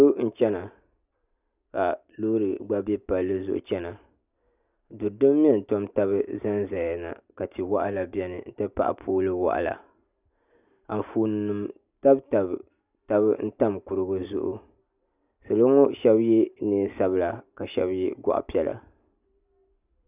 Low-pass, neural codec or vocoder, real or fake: 3.6 kHz; none; real